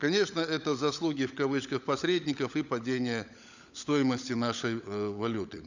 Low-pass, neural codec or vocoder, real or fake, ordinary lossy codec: 7.2 kHz; codec, 16 kHz, 16 kbps, FunCodec, trained on LibriTTS, 50 frames a second; fake; none